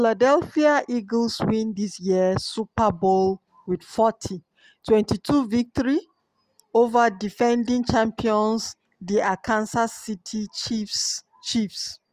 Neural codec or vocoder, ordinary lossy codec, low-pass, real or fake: none; Opus, 32 kbps; 14.4 kHz; real